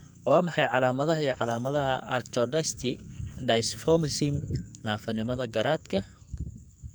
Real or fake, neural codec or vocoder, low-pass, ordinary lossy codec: fake; codec, 44.1 kHz, 2.6 kbps, SNAC; none; none